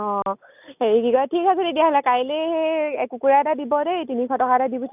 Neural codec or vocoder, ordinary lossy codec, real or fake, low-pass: none; none; real; 3.6 kHz